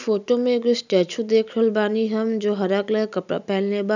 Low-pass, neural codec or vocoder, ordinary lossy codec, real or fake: 7.2 kHz; none; none; real